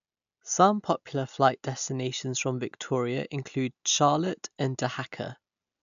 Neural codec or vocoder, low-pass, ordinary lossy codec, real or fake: none; 7.2 kHz; none; real